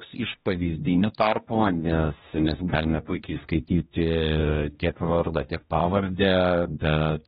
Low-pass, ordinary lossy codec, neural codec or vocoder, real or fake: 7.2 kHz; AAC, 16 kbps; codec, 16 kHz, 1 kbps, X-Codec, HuBERT features, trained on general audio; fake